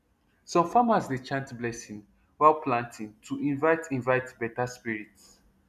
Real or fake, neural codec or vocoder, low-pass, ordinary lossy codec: real; none; 14.4 kHz; none